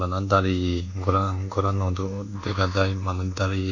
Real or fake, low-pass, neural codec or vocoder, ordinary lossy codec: fake; 7.2 kHz; codec, 24 kHz, 1.2 kbps, DualCodec; none